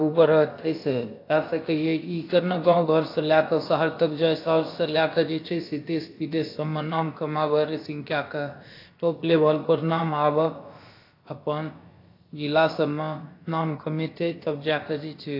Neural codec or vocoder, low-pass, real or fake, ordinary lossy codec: codec, 16 kHz, about 1 kbps, DyCAST, with the encoder's durations; 5.4 kHz; fake; AAC, 32 kbps